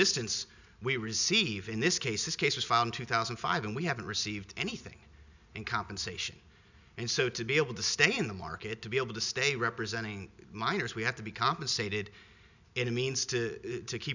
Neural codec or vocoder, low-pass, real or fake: none; 7.2 kHz; real